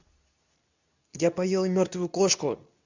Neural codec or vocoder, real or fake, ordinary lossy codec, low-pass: codec, 24 kHz, 0.9 kbps, WavTokenizer, medium speech release version 2; fake; none; 7.2 kHz